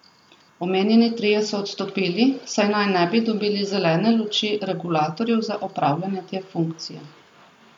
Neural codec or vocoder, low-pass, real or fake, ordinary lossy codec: none; 19.8 kHz; real; none